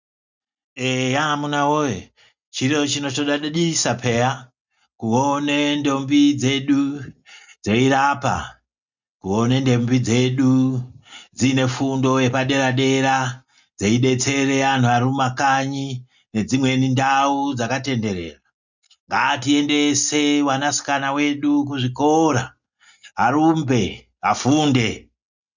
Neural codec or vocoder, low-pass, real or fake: none; 7.2 kHz; real